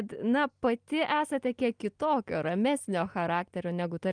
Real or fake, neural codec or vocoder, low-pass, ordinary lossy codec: real; none; 9.9 kHz; Opus, 32 kbps